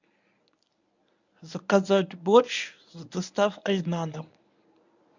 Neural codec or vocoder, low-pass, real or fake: codec, 24 kHz, 0.9 kbps, WavTokenizer, medium speech release version 1; 7.2 kHz; fake